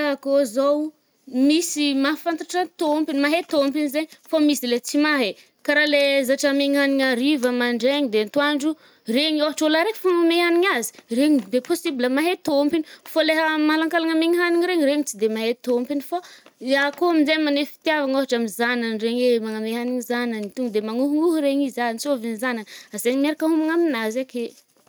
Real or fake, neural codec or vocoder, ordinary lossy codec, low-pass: real; none; none; none